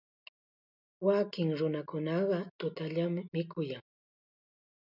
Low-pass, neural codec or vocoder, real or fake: 5.4 kHz; none; real